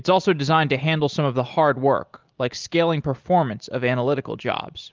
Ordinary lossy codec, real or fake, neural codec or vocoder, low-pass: Opus, 32 kbps; real; none; 7.2 kHz